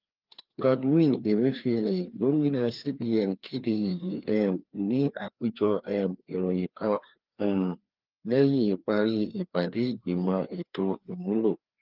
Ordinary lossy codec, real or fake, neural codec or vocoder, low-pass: Opus, 32 kbps; fake; codec, 16 kHz, 2 kbps, FreqCodec, larger model; 5.4 kHz